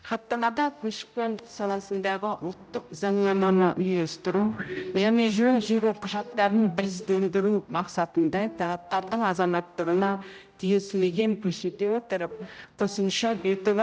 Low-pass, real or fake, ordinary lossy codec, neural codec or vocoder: none; fake; none; codec, 16 kHz, 0.5 kbps, X-Codec, HuBERT features, trained on general audio